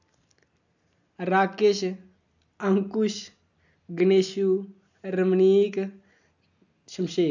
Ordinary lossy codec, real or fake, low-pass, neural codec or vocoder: none; real; 7.2 kHz; none